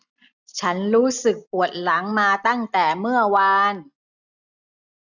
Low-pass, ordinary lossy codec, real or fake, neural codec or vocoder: 7.2 kHz; none; real; none